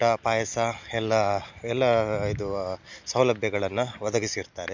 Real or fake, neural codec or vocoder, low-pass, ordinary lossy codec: real; none; 7.2 kHz; MP3, 64 kbps